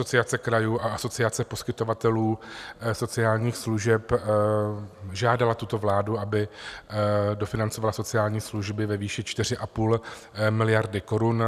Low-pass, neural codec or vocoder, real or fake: 14.4 kHz; none; real